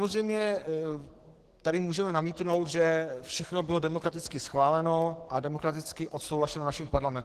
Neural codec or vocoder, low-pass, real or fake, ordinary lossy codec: codec, 44.1 kHz, 2.6 kbps, SNAC; 14.4 kHz; fake; Opus, 16 kbps